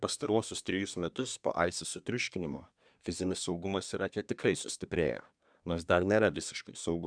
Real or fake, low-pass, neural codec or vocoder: fake; 9.9 kHz; codec, 24 kHz, 1 kbps, SNAC